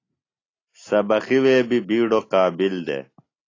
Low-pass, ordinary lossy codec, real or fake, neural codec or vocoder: 7.2 kHz; AAC, 32 kbps; real; none